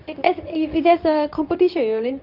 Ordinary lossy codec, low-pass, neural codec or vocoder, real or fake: none; 5.4 kHz; codec, 24 kHz, 0.9 kbps, WavTokenizer, medium speech release version 2; fake